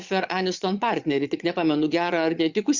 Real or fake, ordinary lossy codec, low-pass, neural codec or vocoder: fake; Opus, 64 kbps; 7.2 kHz; vocoder, 22.05 kHz, 80 mel bands, Vocos